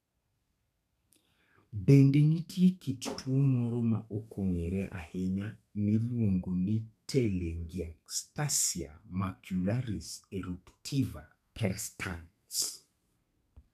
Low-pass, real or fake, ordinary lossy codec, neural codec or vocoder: 14.4 kHz; fake; none; codec, 32 kHz, 1.9 kbps, SNAC